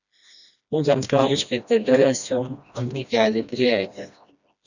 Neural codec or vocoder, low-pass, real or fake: codec, 16 kHz, 1 kbps, FreqCodec, smaller model; 7.2 kHz; fake